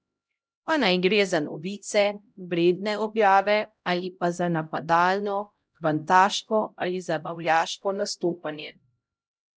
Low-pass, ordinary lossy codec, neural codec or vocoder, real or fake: none; none; codec, 16 kHz, 0.5 kbps, X-Codec, HuBERT features, trained on LibriSpeech; fake